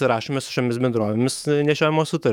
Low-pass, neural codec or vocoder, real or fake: 19.8 kHz; autoencoder, 48 kHz, 128 numbers a frame, DAC-VAE, trained on Japanese speech; fake